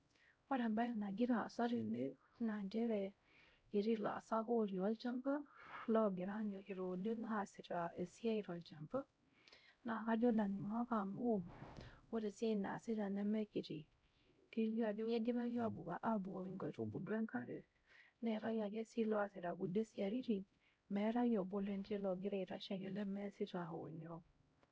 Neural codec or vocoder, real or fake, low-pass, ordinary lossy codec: codec, 16 kHz, 0.5 kbps, X-Codec, HuBERT features, trained on LibriSpeech; fake; none; none